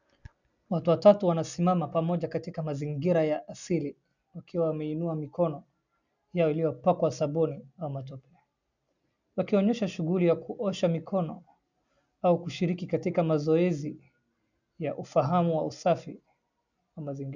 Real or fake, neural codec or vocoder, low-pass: real; none; 7.2 kHz